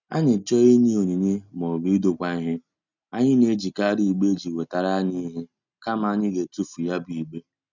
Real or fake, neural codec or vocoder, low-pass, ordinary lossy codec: real; none; 7.2 kHz; none